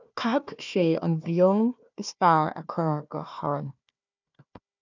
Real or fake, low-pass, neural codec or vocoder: fake; 7.2 kHz; codec, 16 kHz, 1 kbps, FunCodec, trained on Chinese and English, 50 frames a second